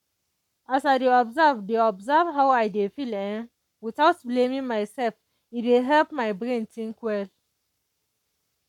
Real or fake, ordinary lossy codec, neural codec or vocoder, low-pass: fake; none; codec, 44.1 kHz, 7.8 kbps, Pupu-Codec; 19.8 kHz